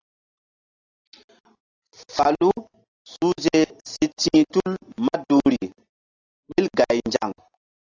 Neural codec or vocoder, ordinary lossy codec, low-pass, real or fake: none; AAC, 32 kbps; 7.2 kHz; real